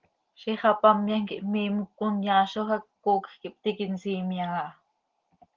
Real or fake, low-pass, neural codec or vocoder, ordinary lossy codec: real; 7.2 kHz; none; Opus, 16 kbps